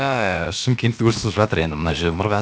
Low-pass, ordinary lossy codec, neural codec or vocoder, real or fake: none; none; codec, 16 kHz, about 1 kbps, DyCAST, with the encoder's durations; fake